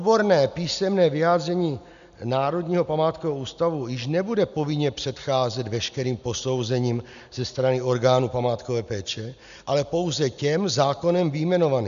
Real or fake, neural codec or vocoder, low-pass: real; none; 7.2 kHz